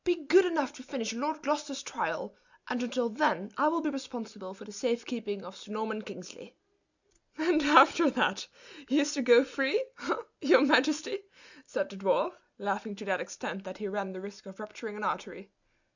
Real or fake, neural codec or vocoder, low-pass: real; none; 7.2 kHz